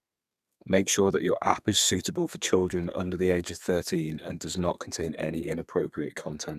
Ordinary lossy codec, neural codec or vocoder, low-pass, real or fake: none; codec, 32 kHz, 1.9 kbps, SNAC; 14.4 kHz; fake